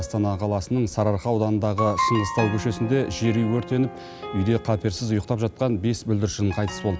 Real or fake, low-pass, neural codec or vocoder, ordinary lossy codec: real; none; none; none